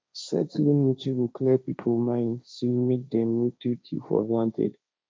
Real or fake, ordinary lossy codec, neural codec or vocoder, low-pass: fake; none; codec, 16 kHz, 1.1 kbps, Voila-Tokenizer; none